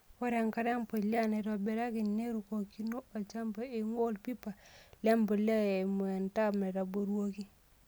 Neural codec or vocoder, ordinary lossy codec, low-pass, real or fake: none; none; none; real